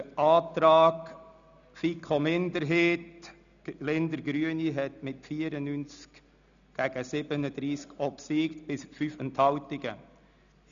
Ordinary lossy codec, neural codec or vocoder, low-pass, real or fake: none; none; 7.2 kHz; real